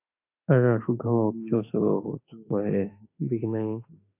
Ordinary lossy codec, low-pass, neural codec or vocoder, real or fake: AAC, 24 kbps; 3.6 kHz; autoencoder, 48 kHz, 32 numbers a frame, DAC-VAE, trained on Japanese speech; fake